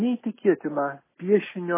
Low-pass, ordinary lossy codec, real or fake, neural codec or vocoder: 3.6 kHz; MP3, 16 kbps; real; none